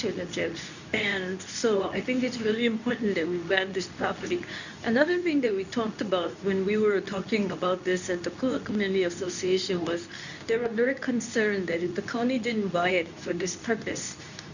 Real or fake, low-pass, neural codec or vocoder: fake; 7.2 kHz; codec, 24 kHz, 0.9 kbps, WavTokenizer, medium speech release version 2